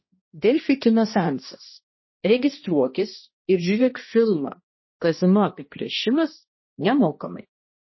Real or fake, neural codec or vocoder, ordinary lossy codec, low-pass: fake; codec, 16 kHz, 1 kbps, X-Codec, HuBERT features, trained on balanced general audio; MP3, 24 kbps; 7.2 kHz